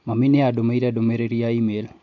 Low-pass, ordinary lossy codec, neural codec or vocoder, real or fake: 7.2 kHz; AAC, 48 kbps; none; real